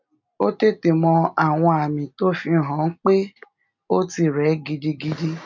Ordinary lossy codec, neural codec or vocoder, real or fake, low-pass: MP3, 64 kbps; none; real; 7.2 kHz